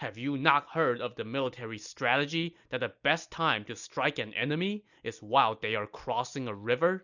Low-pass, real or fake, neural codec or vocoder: 7.2 kHz; real; none